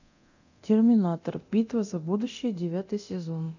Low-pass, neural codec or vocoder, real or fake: 7.2 kHz; codec, 24 kHz, 0.9 kbps, DualCodec; fake